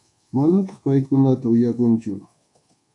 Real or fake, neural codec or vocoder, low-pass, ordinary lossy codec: fake; codec, 24 kHz, 1.2 kbps, DualCodec; 10.8 kHz; AAC, 48 kbps